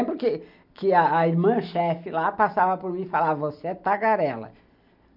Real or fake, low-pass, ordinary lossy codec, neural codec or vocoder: real; 5.4 kHz; none; none